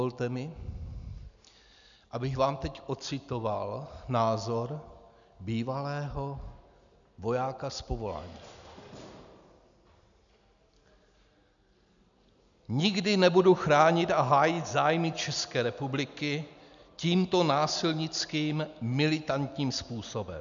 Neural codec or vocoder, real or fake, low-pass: none; real; 7.2 kHz